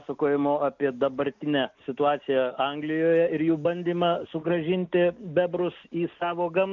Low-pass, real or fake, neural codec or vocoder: 7.2 kHz; real; none